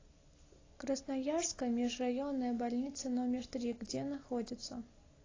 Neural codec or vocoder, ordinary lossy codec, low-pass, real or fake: none; AAC, 32 kbps; 7.2 kHz; real